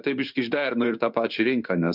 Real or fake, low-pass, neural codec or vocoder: fake; 5.4 kHz; codec, 16 kHz in and 24 kHz out, 1 kbps, XY-Tokenizer